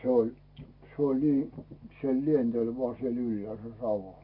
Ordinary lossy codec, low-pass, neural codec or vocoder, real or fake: MP3, 32 kbps; 5.4 kHz; none; real